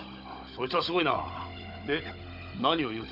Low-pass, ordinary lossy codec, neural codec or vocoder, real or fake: 5.4 kHz; none; codec, 16 kHz, 16 kbps, FunCodec, trained on Chinese and English, 50 frames a second; fake